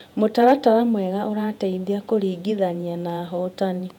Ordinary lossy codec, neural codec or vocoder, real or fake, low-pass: none; vocoder, 48 kHz, 128 mel bands, Vocos; fake; 19.8 kHz